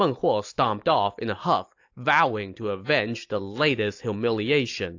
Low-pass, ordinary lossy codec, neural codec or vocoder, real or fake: 7.2 kHz; AAC, 48 kbps; none; real